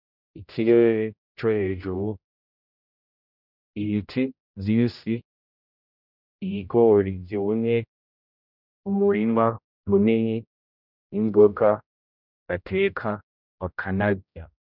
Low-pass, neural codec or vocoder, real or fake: 5.4 kHz; codec, 16 kHz, 0.5 kbps, X-Codec, HuBERT features, trained on general audio; fake